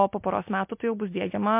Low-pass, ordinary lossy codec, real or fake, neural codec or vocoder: 3.6 kHz; MP3, 32 kbps; real; none